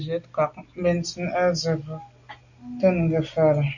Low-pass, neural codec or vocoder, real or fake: 7.2 kHz; none; real